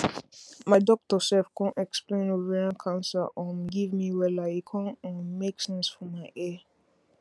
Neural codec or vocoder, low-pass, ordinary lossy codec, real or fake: none; none; none; real